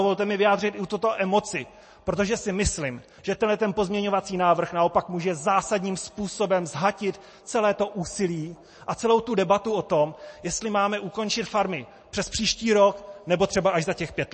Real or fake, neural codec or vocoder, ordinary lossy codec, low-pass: real; none; MP3, 32 kbps; 10.8 kHz